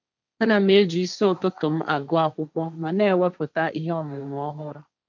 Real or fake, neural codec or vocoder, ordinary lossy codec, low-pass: fake; codec, 16 kHz, 1.1 kbps, Voila-Tokenizer; MP3, 64 kbps; 7.2 kHz